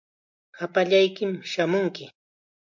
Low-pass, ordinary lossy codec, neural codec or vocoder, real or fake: 7.2 kHz; MP3, 64 kbps; none; real